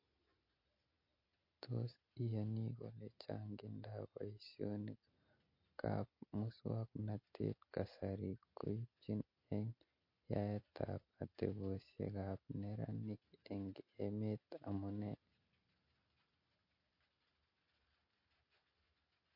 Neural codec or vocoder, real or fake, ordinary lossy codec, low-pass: none; real; MP3, 32 kbps; 5.4 kHz